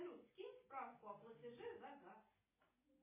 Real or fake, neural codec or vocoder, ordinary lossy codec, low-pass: real; none; MP3, 16 kbps; 3.6 kHz